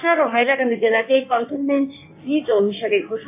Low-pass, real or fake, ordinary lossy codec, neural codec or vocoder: 3.6 kHz; fake; AAC, 24 kbps; codec, 16 kHz in and 24 kHz out, 1.1 kbps, FireRedTTS-2 codec